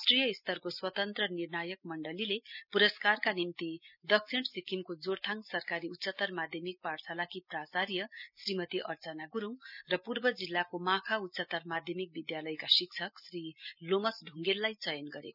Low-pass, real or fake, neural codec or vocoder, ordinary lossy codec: 5.4 kHz; real; none; none